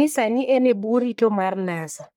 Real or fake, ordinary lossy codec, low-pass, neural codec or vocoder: fake; none; none; codec, 44.1 kHz, 1.7 kbps, Pupu-Codec